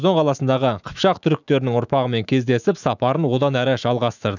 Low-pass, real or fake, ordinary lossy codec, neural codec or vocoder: 7.2 kHz; fake; none; autoencoder, 48 kHz, 128 numbers a frame, DAC-VAE, trained on Japanese speech